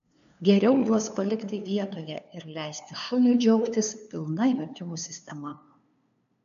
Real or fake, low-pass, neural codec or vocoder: fake; 7.2 kHz; codec, 16 kHz, 2 kbps, FunCodec, trained on LibriTTS, 25 frames a second